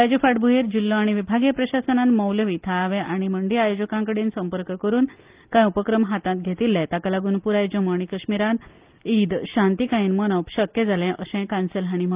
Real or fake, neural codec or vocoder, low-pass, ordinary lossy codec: real; none; 3.6 kHz; Opus, 32 kbps